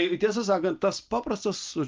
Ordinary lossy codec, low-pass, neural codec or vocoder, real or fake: Opus, 24 kbps; 7.2 kHz; none; real